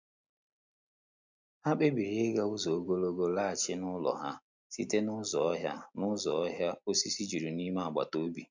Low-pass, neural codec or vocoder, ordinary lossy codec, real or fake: 7.2 kHz; none; MP3, 64 kbps; real